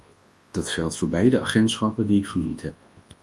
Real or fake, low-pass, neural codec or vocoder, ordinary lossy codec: fake; 10.8 kHz; codec, 24 kHz, 0.9 kbps, WavTokenizer, large speech release; Opus, 32 kbps